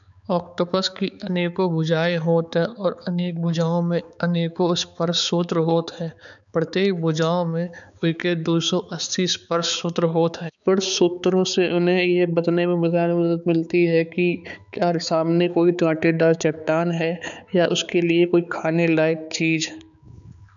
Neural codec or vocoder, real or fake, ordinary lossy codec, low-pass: codec, 16 kHz, 4 kbps, X-Codec, HuBERT features, trained on balanced general audio; fake; none; 7.2 kHz